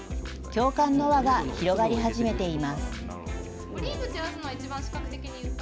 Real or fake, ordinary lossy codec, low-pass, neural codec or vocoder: real; none; none; none